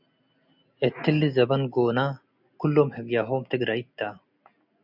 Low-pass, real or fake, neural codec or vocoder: 5.4 kHz; real; none